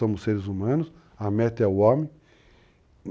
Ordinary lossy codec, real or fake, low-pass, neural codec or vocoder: none; real; none; none